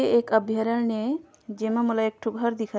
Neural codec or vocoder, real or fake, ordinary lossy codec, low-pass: none; real; none; none